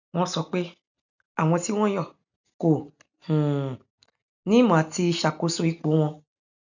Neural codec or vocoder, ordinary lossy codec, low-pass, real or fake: none; none; 7.2 kHz; real